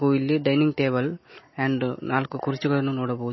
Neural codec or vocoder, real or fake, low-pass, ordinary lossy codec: none; real; 7.2 kHz; MP3, 24 kbps